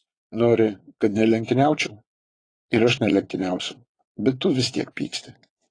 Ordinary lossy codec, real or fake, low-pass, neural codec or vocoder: AAC, 32 kbps; fake; 9.9 kHz; vocoder, 22.05 kHz, 80 mel bands, Vocos